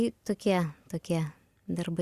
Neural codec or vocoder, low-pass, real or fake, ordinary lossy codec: none; 14.4 kHz; real; Opus, 64 kbps